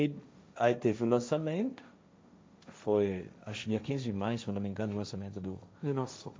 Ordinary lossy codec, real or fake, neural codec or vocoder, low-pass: none; fake; codec, 16 kHz, 1.1 kbps, Voila-Tokenizer; none